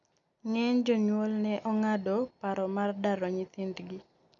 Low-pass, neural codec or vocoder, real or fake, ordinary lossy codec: 7.2 kHz; none; real; none